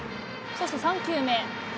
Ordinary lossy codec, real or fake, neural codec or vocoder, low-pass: none; real; none; none